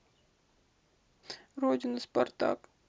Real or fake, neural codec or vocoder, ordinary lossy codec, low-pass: real; none; none; none